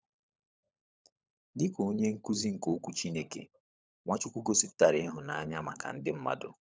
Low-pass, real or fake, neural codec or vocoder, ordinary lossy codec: none; fake; codec, 16 kHz, 16 kbps, FunCodec, trained on LibriTTS, 50 frames a second; none